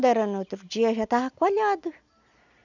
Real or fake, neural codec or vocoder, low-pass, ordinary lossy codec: real; none; 7.2 kHz; none